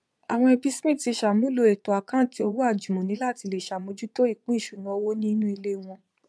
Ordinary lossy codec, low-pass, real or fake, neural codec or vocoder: none; none; fake; vocoder, 22.05 kHz, 80 mel bands, Vocos